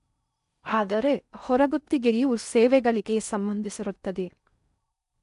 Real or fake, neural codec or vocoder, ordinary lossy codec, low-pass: fake; codec, 16 kHz in and 24 kHz out, 0.6 kbps, FocalCodec, streaming, 4096 codes; none; 10.8 kHz